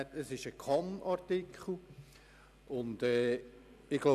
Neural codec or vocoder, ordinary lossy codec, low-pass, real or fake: none; none; 14.4 kHz; real